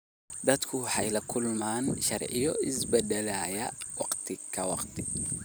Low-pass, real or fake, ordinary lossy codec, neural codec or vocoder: none; real; none; none